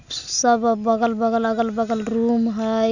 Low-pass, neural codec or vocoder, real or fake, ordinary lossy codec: 7.2 kHz; none; real; none